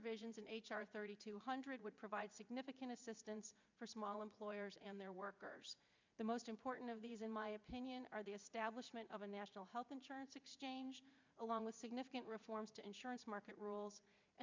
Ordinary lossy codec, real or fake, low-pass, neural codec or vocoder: MP3, 64 kbps; fake; 7.2 kHz; vocoder, 44.1 kHz, 80 mel bands, Vocos